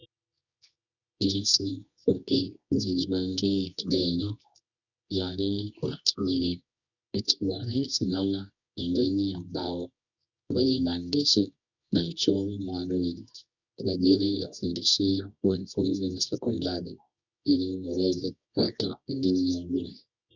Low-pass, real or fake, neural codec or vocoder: 7.2 kHz; fake; codec, 24 kHz, 0.9 kbps, WavTokenizer, medium music audio release